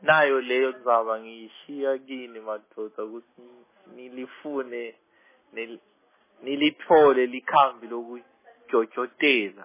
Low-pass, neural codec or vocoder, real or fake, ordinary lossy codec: 3.6 kHz; none; real; MP3, 16 kbps